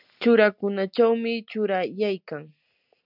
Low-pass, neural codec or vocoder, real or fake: 5.4 kHz; none; real